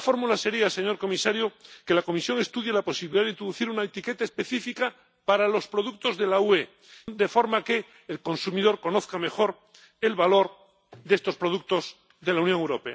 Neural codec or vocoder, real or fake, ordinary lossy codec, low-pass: none; real; none; none